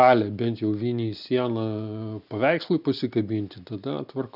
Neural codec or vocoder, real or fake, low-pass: none; real; 5.4 kHz